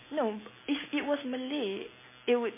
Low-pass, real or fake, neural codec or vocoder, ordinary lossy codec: 3.6 kHz; real; none; MP3, 16 kbps